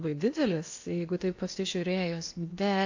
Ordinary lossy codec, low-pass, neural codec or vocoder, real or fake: AAC, 48 kbps; 7.2 kHz; codec, 16 kHz in and 24 kHz out, 0.8 kbps, FocalCodec, streaming, 65536 codes; fake